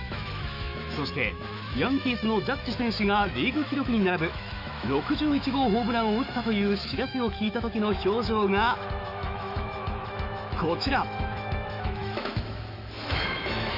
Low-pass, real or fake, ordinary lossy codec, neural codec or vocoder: 5.4 kHz; fake; none; autoencoder, 48 kHz, 128 numbers a frame, DAC-VAE, trained on Japanese speech